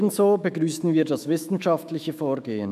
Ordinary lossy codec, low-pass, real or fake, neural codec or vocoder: none; 14.4 kHz; fake; autoencoder, 48 kHz, 128 numbers a frame, DAC-VAE, trained on Japanese speech